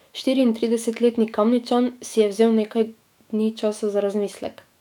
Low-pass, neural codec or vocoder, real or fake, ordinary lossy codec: 19.8 kHz; autoencoder, 48 kHz, 128 numbers a frame, DAC-VAE, trained on Japanese speech; fake; none